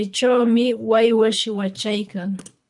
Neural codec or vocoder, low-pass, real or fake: codec, 24 kHz, 3 kbps, HILCodec; 10.8 kHz; fake